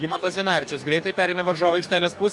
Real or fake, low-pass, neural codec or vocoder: fake; 10.8 kHz; codec, 44.1 kHz, 2.6 kbps, DAC